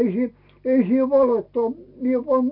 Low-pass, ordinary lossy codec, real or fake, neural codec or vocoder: 5.4 kHz; none; real; none